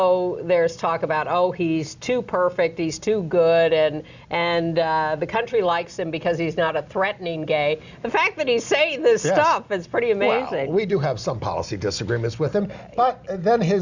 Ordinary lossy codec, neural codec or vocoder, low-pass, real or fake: Opus, 64 kbps; none; 7.2 kHz; real